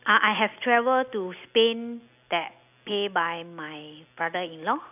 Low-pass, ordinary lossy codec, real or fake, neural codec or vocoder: 3.6 kHz; none; real; none